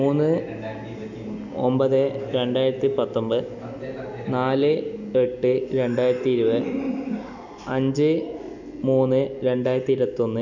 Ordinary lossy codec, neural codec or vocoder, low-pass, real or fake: none; none; 7.2 kHz; real